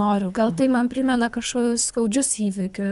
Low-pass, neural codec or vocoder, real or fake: 10.8 kHz; codec, 24 kHz, 3 kbps, HILCodec; fake